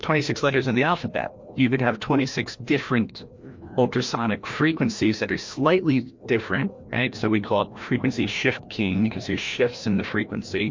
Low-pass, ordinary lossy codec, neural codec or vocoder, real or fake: 7.2 kHz; MP3, 48 kbps; codec, 16 kHz, 1 kbps, FreqCodec, larger model; fake